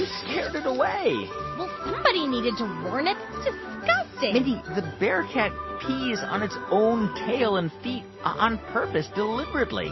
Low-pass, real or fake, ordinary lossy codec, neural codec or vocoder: 7.2 kHz; real; MP3, 24 kbps; none